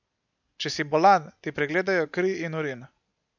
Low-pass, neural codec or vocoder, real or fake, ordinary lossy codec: 7.2 kHz; none; real; none